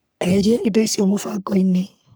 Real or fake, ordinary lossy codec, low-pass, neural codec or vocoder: fake; none; none; codec, 44.1 kHz, 3.4 kbps, Pupu-Codec